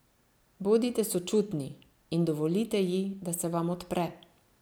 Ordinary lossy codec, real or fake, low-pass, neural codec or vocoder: none; real; none; none